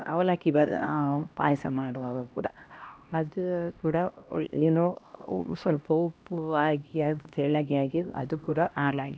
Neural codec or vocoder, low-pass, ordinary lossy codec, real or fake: codec, 16 kHz, 1 kbps, X-Codec, HuBERT features, trained on LibriSpeech; none; none; fake